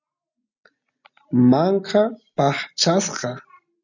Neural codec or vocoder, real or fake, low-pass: none; real; 7.2 kHz